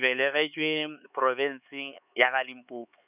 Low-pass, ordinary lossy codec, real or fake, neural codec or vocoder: 3.6 kHz; none; fake; codec, 16 kHz, 4 kbps, X-Codec, HuBERT features, trained on LibriSpeech